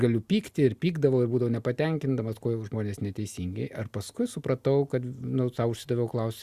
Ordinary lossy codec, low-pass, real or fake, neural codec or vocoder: Opus, 64 kbps; 14.4 kHz; real; none